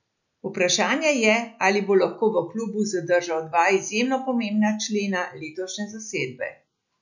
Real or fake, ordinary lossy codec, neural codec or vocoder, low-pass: real; none; none; 7.2 kHz